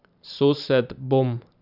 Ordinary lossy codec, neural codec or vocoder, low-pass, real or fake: none; vocoder, 44.1 kHz, 128 mel bands every 512 samples, BigVGAN v2; 5.4 kHz; fake